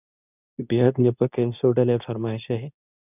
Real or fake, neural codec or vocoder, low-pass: fake; codec, 24 kHz, 0.9 kbps, WavTokenizer, medium speech release version 2; 3.6 kHz